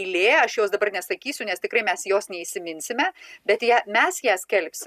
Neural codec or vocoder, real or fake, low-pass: none; real; 14.4 kHz